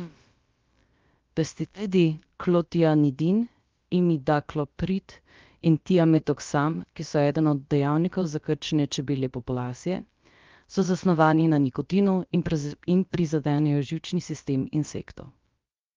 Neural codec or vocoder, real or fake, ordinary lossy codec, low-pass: codec, 16 kHz, about 1 kbps, DyCAST, with the encoder's durations; fake; Opus, 32 kbps; 7.2 kHz